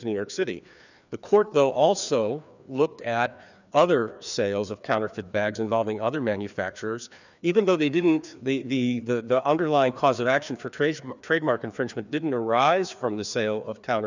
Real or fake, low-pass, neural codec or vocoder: fake; 7.2 kHz; codec, 16 kHz, 2 kbps, FreqCodec, larger model